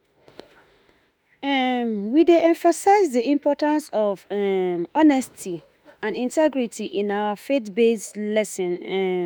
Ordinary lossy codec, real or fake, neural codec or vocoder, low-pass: none; fake; autoencoder, 48 kHz, 32 numbers a frame, DAC-VAE, trained on Japanese speech; none